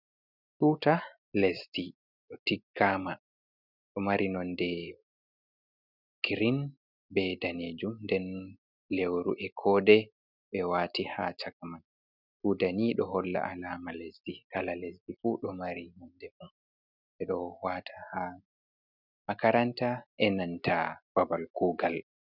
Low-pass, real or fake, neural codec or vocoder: 5.4 kHz; real; none